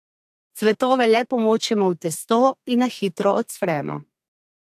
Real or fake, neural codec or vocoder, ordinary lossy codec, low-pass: fake; codec, 32 kHz, 1.9 kbps, SNAC; AAC, 64 kbps; 14.4 kHz